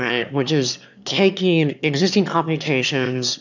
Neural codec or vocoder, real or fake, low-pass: autoencoder, 22.05 kHz, a latent of 192 numbers a frame, VITS, trained on one speaker; fake; 7.2 kHz